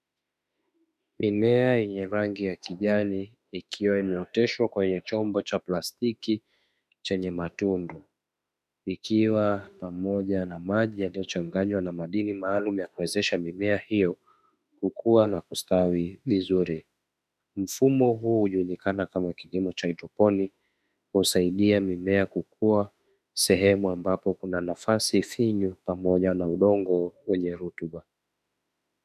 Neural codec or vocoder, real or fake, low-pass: autoencoder, 48 kHz, 32 numbers a frame, DAC-VAE, trained on Japanese speech; fake; 14.4 kHz